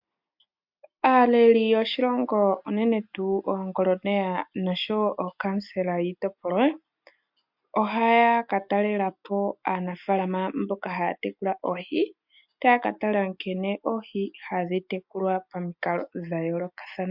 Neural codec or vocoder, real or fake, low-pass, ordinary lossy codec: none; real; 5.4 kHz; MP3, 48 kbps